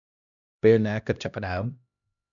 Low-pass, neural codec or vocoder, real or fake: 7.2 kHz; codec, 16 kHz, 1 kbps, X-Codec, HuBERT features, trained on LibriSpeech; fake